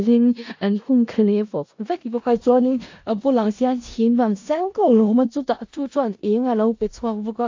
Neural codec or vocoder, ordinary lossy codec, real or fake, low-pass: codec, 16 kHz in and 24 kHz out, 0.4 kbps, LongCat-Audio-Codec, four codebook decoder; AAC, 48 kbps; fake; 7.2 kHz